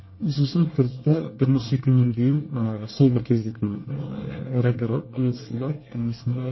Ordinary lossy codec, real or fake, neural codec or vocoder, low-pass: MP3, 24 kbps; fake; codec, 24 kHz, 1 kbps, SNAC; 7.2 kHz